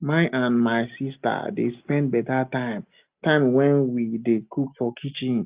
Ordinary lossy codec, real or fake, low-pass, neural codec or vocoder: Opus, 24 kbps; real; 3.6 kHz; none